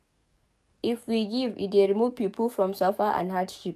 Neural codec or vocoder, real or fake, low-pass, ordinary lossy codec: codec, 44.1 kHz, 7.8 kbps, DAC; fake; 14.4 kHz; none